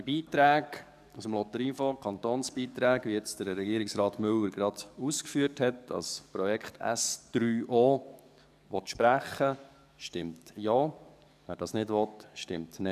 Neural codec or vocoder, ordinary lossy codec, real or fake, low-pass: codec, 44.1 kHz, 7.8 kbps, DAC; none; fake; 14.4 kHz